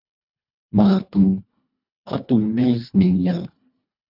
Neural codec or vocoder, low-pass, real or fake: codec, 24 kHz, 1.5 kbps, HILCodec; 5.4 kHz; fake